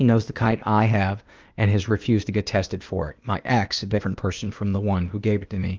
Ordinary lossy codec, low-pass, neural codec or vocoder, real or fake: Opus, 24 kbps; 7.2 kHz; codec, 16 kHz, 0.8 kbps, ZipCodec; fake